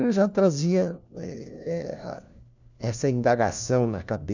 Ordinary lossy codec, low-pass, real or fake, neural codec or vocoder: none; 7.2 kHz; fake; codec, 16 kHz, 1 kbps, FunCodec, trained on LibriTTS, 50 frames a second